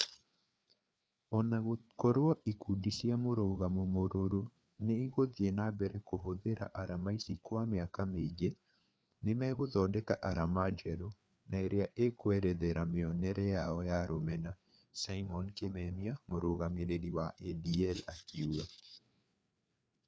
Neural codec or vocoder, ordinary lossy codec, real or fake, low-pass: codec, 16 kHz, 4 kbps, FreqCodec, larger model; none; fake; none